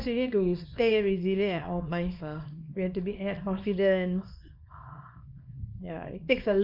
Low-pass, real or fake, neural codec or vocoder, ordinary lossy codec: 5.4 kHz; fake; codec, 24 kHz, 0.9 kbps, WavTokenizer, small release; MP3, 48 kbps